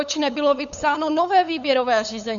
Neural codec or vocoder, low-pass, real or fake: codec, 16 kHz, 8 kbps, FunCodec, trained on LibriTTS, 25 frames a second; 7.2 kHz; fake